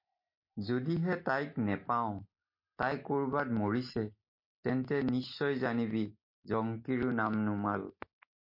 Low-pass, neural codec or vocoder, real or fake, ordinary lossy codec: 5.4 kHz; none; real; MP3, 32 kbps